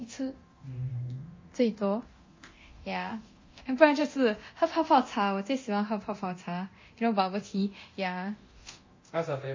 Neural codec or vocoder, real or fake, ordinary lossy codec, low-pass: codec, 24 kHz, 0.9 kbps, DualCodec; fake; MP3, 32 kbps; 7.2 kHz